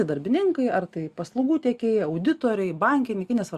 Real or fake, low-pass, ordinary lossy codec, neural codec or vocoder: real; 14.4 kHz; Opus, 64 kbps; none